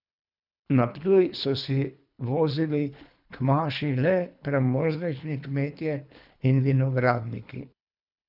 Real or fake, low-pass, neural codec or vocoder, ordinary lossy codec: fake; 5.4 kHz; codec, 24 kHz, 3 kbps, HILCodec; none